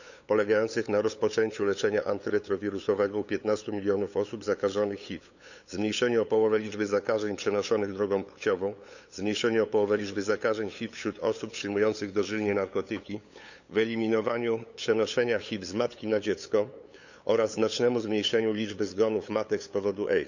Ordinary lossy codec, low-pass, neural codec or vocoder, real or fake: none; 7.2 kHz; codec, 16 kHz, 8 kbps, FunCodec, trained on LibriTTS, 25 frames a second; fake